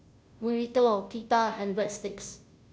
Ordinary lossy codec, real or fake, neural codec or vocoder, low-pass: none; fake; codec, 16 kHz, 0.5 kbps, FunCodec, trained on Chinese and English, 25 frames a second; none